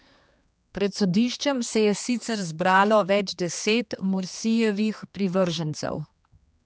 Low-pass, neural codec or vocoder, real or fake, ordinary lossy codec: none; codec, 16 kHz, 2 kbps, X-Codec, HuBERT features, trained on general audio; fake; none